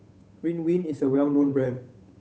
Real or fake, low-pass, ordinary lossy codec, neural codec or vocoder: fake; none; none; codec, 16 kHz, 8 kbps, FunCodec, trained on Chinese and English, 25 frames a second